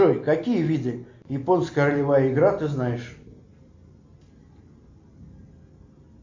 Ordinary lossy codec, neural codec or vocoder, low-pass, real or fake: MP3, 48 kbps; none; 7.2 kHz; real